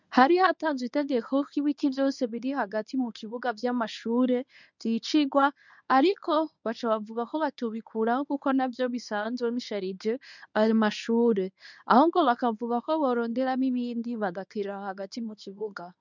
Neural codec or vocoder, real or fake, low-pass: codec, 24 kHz, 0.9 kbps, WavTokenizer, medium speech release version 1; fake; 7.2 kHz